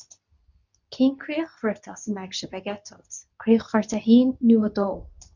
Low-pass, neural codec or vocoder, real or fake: 7.2 kHz; codec, 24 kHz, 0.9 kbps, WavTokenizer, medium speech release version 2; fake